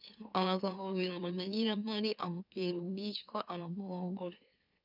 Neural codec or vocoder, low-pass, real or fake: autoencoder, 44.1 kHz, a latent of 192 numbers a frame, MeloTTS; 5.4 kHz; fake